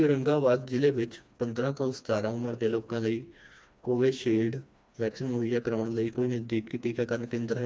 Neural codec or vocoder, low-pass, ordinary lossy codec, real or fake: codec, 16 kHz, 2 kbps, FreqCodec, smaller model; none; none; fake